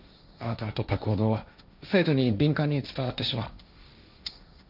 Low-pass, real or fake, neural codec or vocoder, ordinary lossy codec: 5.4 kHz; fake; codec, 16 kHz, 1.1 kbps, Voila-Tokenizer; none